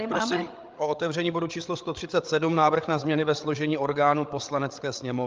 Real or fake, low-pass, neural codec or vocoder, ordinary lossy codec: fake; 7.2 kHz; codec, 16 kHz, 8 kbps, FreqCodec, larger model; Opus, 24 kbps